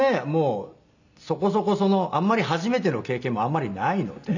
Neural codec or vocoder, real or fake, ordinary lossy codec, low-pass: none; real; none; 7.2 kHz